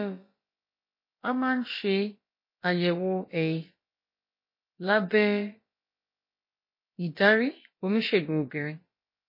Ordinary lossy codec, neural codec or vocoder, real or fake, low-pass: MP3, 24 kbps; codec, 16 kHz, about 1 kbps, DyCAST, with the encoder's durations; fake; 5.4 kHz